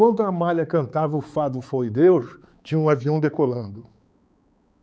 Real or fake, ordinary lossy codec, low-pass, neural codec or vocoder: fake; none; none; codec, 16 kHz, 4 kbps, X-Codec, HuBERT features, trained on balanced general audio